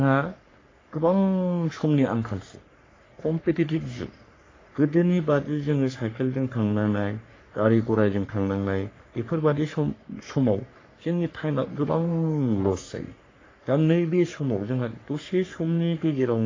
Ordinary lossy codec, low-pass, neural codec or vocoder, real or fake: AAC, 32 kbps; 7.2 kHz; codec, 44.1 kHz, 3.4 kbps, Pupu-Codec; fake